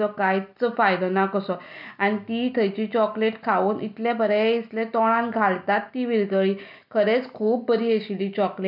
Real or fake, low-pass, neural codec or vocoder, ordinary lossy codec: real; 5.4 kHz; none; none